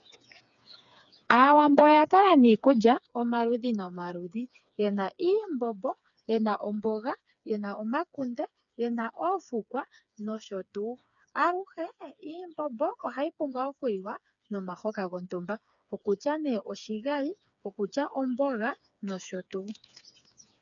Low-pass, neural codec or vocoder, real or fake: 7.2 kHz; codec, 16 kHz, 4 kbps, FreqCodec, smaller model; fake